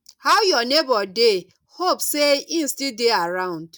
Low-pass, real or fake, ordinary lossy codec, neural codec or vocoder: 19.8 kHz; real; none; none